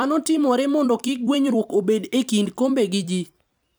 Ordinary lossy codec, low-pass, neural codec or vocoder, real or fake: none; none; vocoder, 44.1 kHz, 128 mel bands every 256 samples, BigVGAN v2; fake